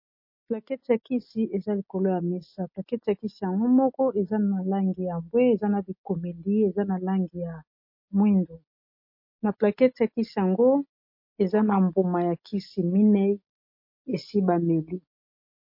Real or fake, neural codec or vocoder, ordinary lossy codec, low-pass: real; none; MP3, 32 kbps; 5.4 kHz